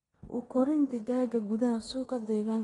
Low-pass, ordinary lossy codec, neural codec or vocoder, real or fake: 10.8 kHz; AAC, 32 kbps; codec, 16 kHz in and 24 kHz out, 0.9 kbps, LongCat-Audio-Codec, four codebook decoder; fake